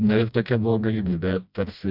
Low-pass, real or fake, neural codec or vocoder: 5.4 kHz; fake; codec, 16 kHz, 1 kbps, FreqCodec, smaller model